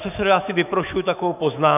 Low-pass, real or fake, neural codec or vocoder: 3.6 kHz; fake; autoencoder, 48 kHz, 128 numbers a frame, DAC-VAE, trained on Japanese speech